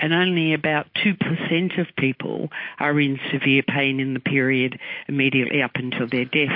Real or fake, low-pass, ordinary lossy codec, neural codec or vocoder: real; 5.4 kHz; MP3, 32 kbps; none